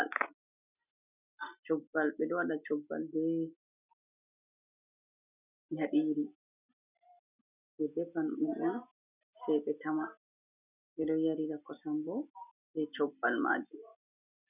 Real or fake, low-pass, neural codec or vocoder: real; 3.6 kHz; none